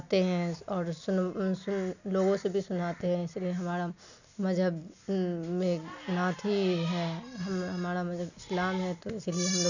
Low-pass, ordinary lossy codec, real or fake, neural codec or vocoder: 7.2 kHz; none; real; none